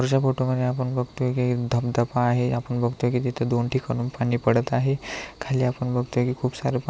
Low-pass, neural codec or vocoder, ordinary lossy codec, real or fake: none; none; none; real